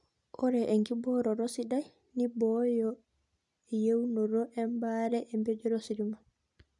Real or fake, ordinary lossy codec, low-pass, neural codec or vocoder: real; none; 10.8 kHz; none